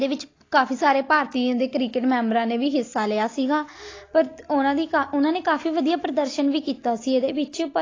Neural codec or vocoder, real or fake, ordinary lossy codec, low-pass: none; real; AAC, 32 kbps; 7.2 kHz